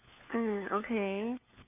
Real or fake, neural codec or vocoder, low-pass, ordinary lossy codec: fake; codec, 16 kHz, 4 kbps, FunCodec, trained on LibriTTS, 50 frames a second; 3.6 kHz; none